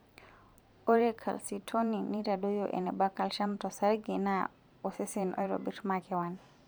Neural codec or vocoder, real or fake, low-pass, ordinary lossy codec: vocoder, 44.1 kHz, 128 mel bands every 512 samples, BigVGAN v2; fake; none; none